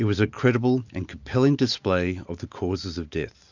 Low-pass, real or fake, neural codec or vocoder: 7.2 kHz; real; none